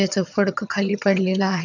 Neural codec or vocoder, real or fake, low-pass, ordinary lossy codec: vocoder, 22.05 kHz, 80 mel bands, HiFi-GAN; fake; 7.2 kHz; none